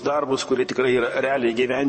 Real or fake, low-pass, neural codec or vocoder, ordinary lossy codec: fake; 10.8 kHz; vocoder, 44.1 kHz, 128 mel bands, Pupu-Vocoder; MP3, 32 kbps